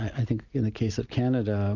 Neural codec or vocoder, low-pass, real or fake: none; 7.2 kHz; real